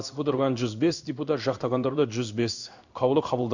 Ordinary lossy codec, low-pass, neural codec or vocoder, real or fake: none; 7.2 kHz; codec, 16 kHz in and 24 kHz out, 1 kbps, XY-Tokenizer; fake